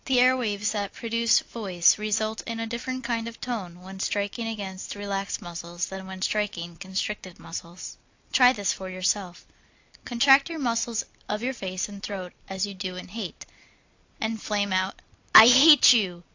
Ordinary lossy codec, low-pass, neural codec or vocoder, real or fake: AAC, 48 kbps; 7.2 kHz; none; real